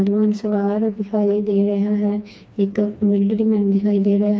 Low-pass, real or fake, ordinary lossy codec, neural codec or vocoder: none; fake; none; codec, 16 kHz, 2 kbps, FreqCodec, smaller model